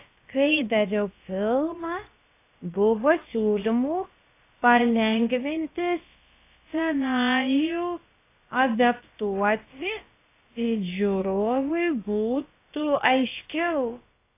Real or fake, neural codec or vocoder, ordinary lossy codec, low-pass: fake; codec, 16 kHz, about 1 kbps, DyCAST, with the encoder's durations; AAC, 24 kbps; 3.6 kHz